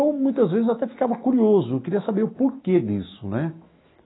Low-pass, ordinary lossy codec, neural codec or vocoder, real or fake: 7.2 kHz; AAC, 16 kbps; autoencoder, 48 kHz, 128 numbers a frame, DAC-VAE, trained on Japanese speech; fake